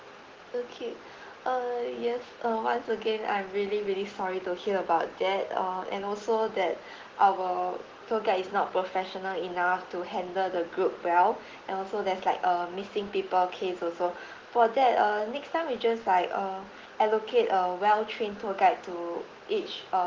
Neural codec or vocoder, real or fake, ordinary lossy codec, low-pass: none; real; Opus, 16 kbps; 7.2 kHz